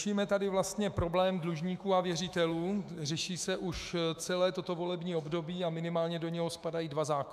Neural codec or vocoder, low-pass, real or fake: autoencoder, 48 kHz, 128 numbers a frame, DAC-VAE, trained on Japanese speech; 14.4 kHz; fake